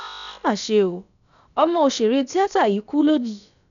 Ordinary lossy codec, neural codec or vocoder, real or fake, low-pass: none; codec, 16 kHz, about 1 kbps, DyCAST, with the encoder's durations; fake; 7.2 kHz